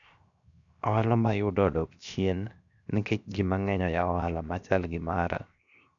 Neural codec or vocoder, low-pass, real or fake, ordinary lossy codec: codec, 16 kHz, 0.7 kbps, FocalCodec; 7.2 kHz; fake; none